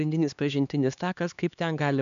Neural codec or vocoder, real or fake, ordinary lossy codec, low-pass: codec, 16 kHz, 2 kbps, X-Codec, HuBERT features, trained on LibriSpeech; fake; MP3, 96 kbps; 7.2 kHz